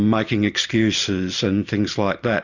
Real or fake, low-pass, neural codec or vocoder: real; 7.2 kHz; none